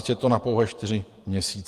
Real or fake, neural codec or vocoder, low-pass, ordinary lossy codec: fake; vocoder, 48 kHz, 128 mel bands, Vocos; 14.4 kHz; Opus, 32 kbps